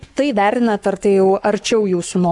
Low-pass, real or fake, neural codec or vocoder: 10.8 kHz; fake; codec, 44.1 kHz, 7.8 kbps, Pupu-Codec